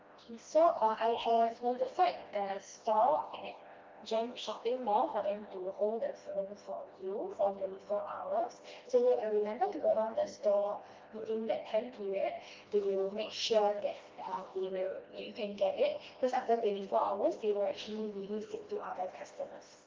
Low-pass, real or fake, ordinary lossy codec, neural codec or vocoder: 7.2 kHz; fake; Opus, 24 kbps; codec, 16 kHz, 1 kbps, FreqCodec, smaller model